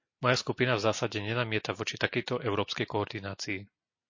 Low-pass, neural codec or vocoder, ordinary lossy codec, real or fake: 7.2 kHz; none; MP3, 32 kbps; real